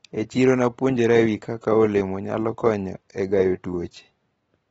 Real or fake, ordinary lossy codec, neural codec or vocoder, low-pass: real; AAC, 24 kbps; none; 10.8 kHz